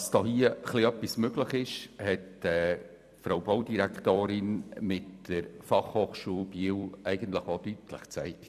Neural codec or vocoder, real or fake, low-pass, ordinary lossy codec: vocoder, 44.1 kHz, 128 mel bands every 256 samples, BigVGAN v2; fake; 14.4 kHz; none